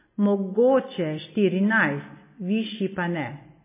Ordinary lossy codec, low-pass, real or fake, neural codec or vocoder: MP3, 16 kbps; 3.6 kHz; real; none